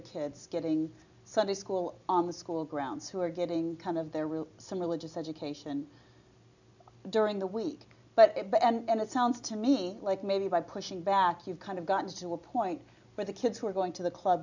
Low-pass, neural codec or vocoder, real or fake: 7.2 kHz; none; real